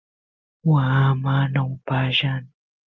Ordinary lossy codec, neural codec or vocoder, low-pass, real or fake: Opus, 24 kbps; none; 7.2 kHz; real